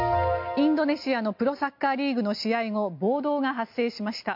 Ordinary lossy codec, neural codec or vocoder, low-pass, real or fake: MP3, 48 kbps; none; 5.4 kHz; real